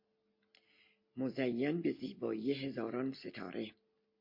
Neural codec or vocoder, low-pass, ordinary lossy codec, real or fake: none; 5.4 kHz; MP3, 32 kbps; real